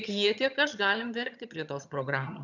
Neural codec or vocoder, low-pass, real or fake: vocoder, 22.05 kHz, 80 mel bands, HiFi-GAN; 7.2 kHz; fake